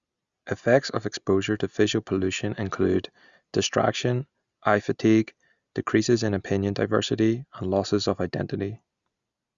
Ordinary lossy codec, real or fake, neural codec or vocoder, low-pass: Opus, 64 kbps; real; none; 7.2 kHz